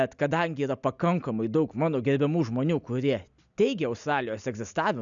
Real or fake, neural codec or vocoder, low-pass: real; none; 7.2 kHz